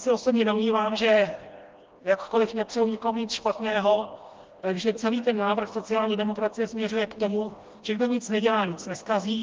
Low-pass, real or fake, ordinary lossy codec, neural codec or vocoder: 7.2 kHz; fake; Opus, 24 kbps; codec, 16 kHz, 1 kbps, FreqCodec, smaller model